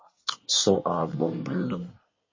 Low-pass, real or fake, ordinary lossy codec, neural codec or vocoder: 7.2 kHz; fake; MP3, 32 kbps; codec, 24 kHz, 1 kbps, SNAC